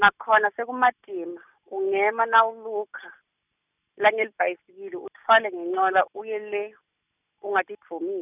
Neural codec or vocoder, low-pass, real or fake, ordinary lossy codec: none; 3.6 kHz; real; none